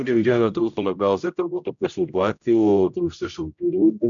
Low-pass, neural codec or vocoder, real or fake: 7.2 kHz; codec, 16 kHz, 0.5 kbps, X-Codec, HuBERT features, trained on general audio; fake